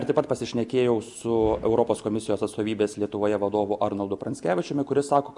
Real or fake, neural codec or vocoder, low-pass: real; none; 10.8 kHz